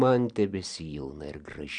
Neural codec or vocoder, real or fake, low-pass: none; real; 9.9 kHz